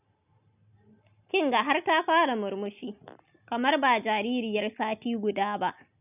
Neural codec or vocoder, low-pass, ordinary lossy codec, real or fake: none; 3.6 kHz; none; real